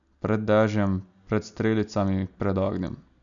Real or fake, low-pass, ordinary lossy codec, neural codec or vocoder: real; 7.2 kHz; none; none